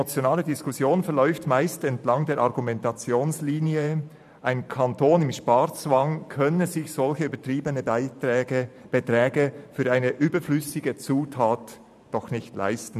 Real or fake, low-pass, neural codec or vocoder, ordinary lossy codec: fake; 14.4 kHz; vocoder, 44.1 kHz, 128 mel bands every 512 samples, BigVGAN v2; none